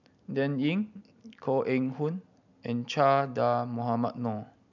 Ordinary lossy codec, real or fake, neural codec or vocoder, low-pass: none; real; none; 7.2 kHz